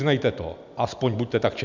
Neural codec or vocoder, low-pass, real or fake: none; 7.2 kHz; real